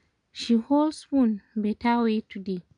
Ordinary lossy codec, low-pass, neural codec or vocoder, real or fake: none; 9.9 kHz; none; real